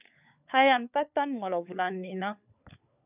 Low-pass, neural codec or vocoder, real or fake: 3.6 kHz; codec, 16 kHz, 4 kbps, FunCodec, trained on LibriTTS, 50 frames a second; fake